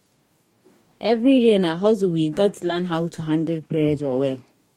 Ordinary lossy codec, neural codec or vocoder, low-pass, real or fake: MP3, 64 kbps; codec, 44.1 kHz, 2.6 kbps, DAC; 19.8 kHz; fake